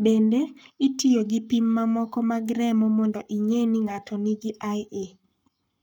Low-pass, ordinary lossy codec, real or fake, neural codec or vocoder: 19.8 kHz; none; fake; codec, 44.1 kHz, 7.8 kbps, Pupu-Codec